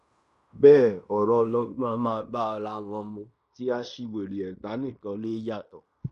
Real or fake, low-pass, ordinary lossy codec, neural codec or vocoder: fake; 10.8 kHz; none; codec, 16 kHz in and 24 kHz out, 0.9 kbps, LongCat-Audio-Codec, fine tuned four codebook decoder